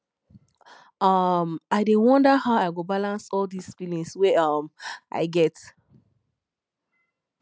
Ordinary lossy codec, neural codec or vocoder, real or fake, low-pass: none; none; real; none